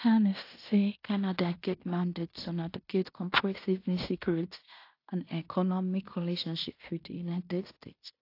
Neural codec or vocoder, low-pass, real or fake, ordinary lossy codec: codec, 16 kHz in and 24 kHz out, 0.9 kbps, LongCat-Audio-Codec, fine tuned four codebook decoder; 5.4 kHz; fake; AAC, 32 kbps